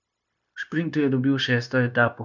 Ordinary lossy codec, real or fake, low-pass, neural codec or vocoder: none; fake; 7.2 kHz; codec, 16 kHz, 0.9 kbps, LongCat-Audio-Codec